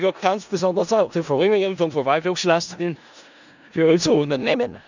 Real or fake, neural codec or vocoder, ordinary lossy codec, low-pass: fake; codec, 16 kHz in and 24 kHz out, 0.4 kbps, LongCat-Audio-Codec, four codebook decoder; none; 7.2 kHz